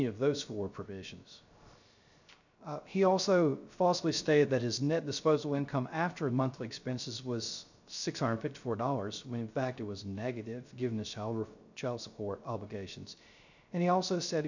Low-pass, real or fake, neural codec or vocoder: 7.2 kHz; fake; codec, 16 kHz, 0.3 kbps, FocalCodec